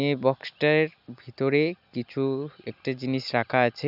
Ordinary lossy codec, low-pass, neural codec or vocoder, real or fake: none; 5.4 kHz; none; real